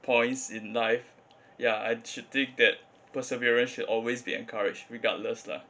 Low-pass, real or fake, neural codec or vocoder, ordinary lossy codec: none; real; none; none